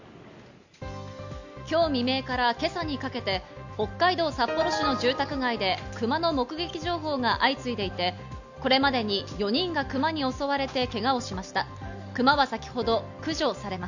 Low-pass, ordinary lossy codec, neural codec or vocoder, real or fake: 7.2 kHz; none; none; real